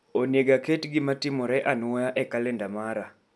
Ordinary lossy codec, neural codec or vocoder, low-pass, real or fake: none; none; none; real